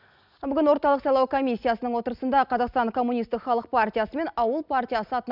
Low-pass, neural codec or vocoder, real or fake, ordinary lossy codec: 5.4 kHz; none; real; none